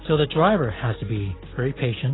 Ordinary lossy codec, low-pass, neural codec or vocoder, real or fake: AAC, 16 kbps; 7.2 kHz; none; real